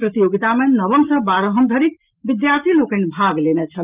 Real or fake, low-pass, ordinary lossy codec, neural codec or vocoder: real; 3.6 kHz; Opus, 32 kbps; none